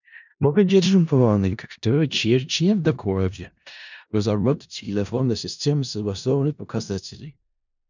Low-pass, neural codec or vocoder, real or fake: 7.2 kHz; codec, 16 kHz in and 24 kHz out, 0.4 kbps, LongCat-Audio-Codec, four codebook decoder; fake